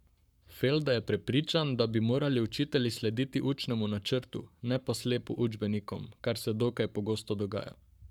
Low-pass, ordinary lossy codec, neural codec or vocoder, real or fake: 19.8 kHz; none; codec, 44.1 kHz, 7.8 kbps, Pupu-Codec; fake